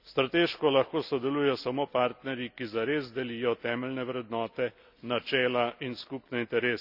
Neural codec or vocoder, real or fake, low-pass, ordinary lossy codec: none; real; 5.4 kHz; none